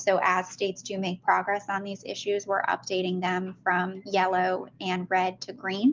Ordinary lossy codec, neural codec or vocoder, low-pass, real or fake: Opus, 32 kbps; none; 7.2 kHz; real